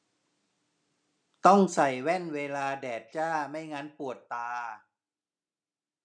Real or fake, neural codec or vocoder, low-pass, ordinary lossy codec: real; none; 9.9 kHz; none